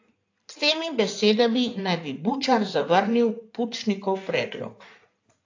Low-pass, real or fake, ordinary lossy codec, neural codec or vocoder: 7.2 kHz; fake; AAC, 48 kbps; codec, 16 kHz in and 24 kHz out, 2.2 kbps, FireRedTTS-2 codec